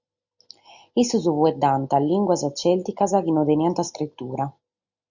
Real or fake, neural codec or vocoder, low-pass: real; none; 7.2 kHz